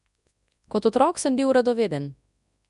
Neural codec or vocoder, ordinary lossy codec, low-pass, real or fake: codec, 24 kHz, 0.9 kbps, WavTokenizer, large speech release; none; 10.8 kHz; fake